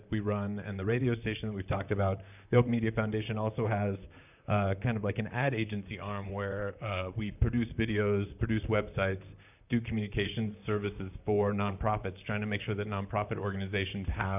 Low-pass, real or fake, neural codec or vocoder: 3.6 kHz; fake; vocoder, 44.1 kHz, 128 mel bands, Pupu-Vocoder